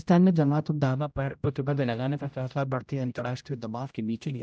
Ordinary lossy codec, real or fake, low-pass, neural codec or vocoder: none; fake; none; codec, 16 kHz, 0.5 kbps, X-Codec, HuBERT features, trained on general audio